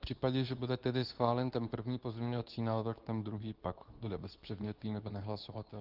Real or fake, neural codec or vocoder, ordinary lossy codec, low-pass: fake; codec, 24 kHz, 0.9 kbps, WavTokenizer, medium speech release version 2; Opus, 32 kbps; 5.4 kHz